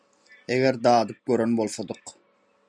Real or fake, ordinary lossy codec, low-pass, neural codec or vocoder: real; MP3, 96 kbps; 9.9 kHz; none